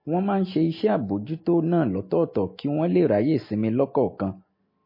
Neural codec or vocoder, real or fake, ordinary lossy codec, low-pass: none; real; MP3, 24 kbps; 5.4 kHz